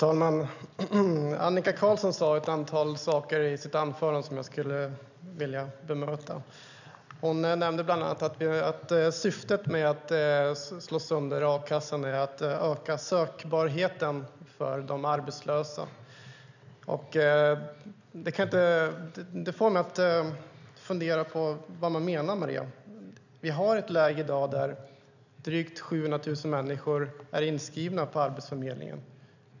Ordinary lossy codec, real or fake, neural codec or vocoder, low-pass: none; real; none; 7.2 kHz